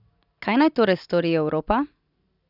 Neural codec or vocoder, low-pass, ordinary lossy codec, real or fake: none; 5.4 kHz; none; real